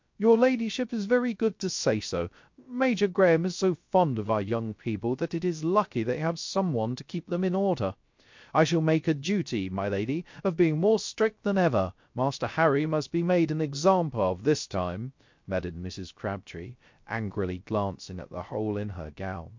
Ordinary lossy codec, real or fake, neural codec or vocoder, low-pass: MP3, 48 kbps; fake; codec, 16 kHz, 0.3 kbps, FocalCodec; 7.2 kHz